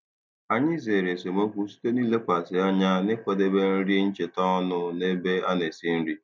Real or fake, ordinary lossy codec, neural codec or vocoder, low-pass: real; none; none; none